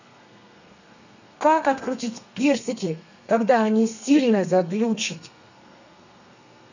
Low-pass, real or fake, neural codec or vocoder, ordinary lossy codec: 7.2 kHz; fake; codec, 32 kHz, 1.9 kbps, SNAC; none